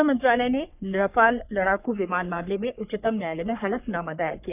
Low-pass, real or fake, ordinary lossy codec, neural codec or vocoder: 3.6 kHz; fake; none; codec, 44.1 kHz, 3.4 kbps, Pupu-Codec